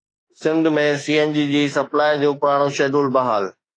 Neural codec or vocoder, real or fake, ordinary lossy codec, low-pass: autoencoder, 48 kHz, 32 numbers a frame, DAC-VAE, trained on Japanese speech; fake; AAC, 32 kbps; 9.9 kHz